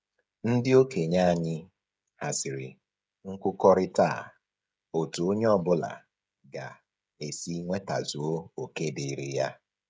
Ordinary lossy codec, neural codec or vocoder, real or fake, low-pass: none; codec, 16 kHz, 16 kbps, FreqCodec, smaller model; fake; none